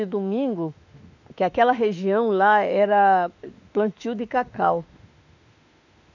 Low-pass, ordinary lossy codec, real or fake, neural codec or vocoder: 7.2 kHz; none; fake; autoencoder, 48 kHz, 32 numbers a frame, DAC-VAE, trained on Japanese speech